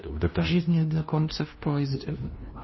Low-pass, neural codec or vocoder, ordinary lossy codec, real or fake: 7.2 kHz; codec, 16 kHz, 0.5 kbps, FunCodec, trained on LibriTTS, 25 frames a second; MP3, 24 kbps; fake